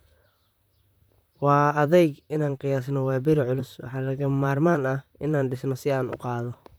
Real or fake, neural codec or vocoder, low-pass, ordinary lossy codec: fake; vocoder, 44.1 kHz, 128 mel bands, Pupu-Vocoder; none; none